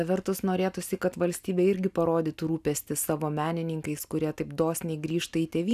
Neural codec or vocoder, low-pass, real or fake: none; 14.4 kHz; real